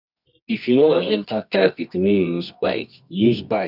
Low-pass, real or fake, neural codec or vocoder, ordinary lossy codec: 5.4 kHz; fake; codec, 24 kHz, 0.9 kbps, WavTokenizer, medium music audio release; none